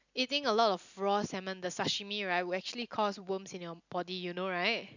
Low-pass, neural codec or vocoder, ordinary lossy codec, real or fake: 7.2 kHz; none; none; real